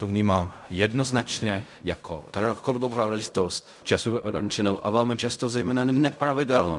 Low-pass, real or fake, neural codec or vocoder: 10.8 kHz; fake; codec, 16 kHz in and 24 kHz out, 0.4 kbps, LongCat-Audio-Codec, fine tuned four codebook decoder